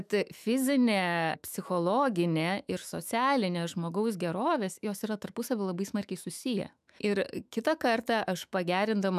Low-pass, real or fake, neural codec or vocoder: 14.4 kHz; fake; autoencoder, 48 kHz, 128 numbers a frame, DAC-VAE, trained on Japanese speech